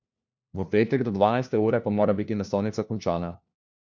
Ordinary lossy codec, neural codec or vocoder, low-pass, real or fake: none; codec, 16 kHz, 1 kbps, FunCodec, trained on LibriTTS, 50 frames a second; none; fake